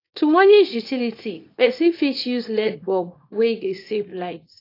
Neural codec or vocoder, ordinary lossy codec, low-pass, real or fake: codec, 24 kHz, 0.9 kbps, WavTokenizer, small release; AAC, 32 kbps; 5.4 kHz; fake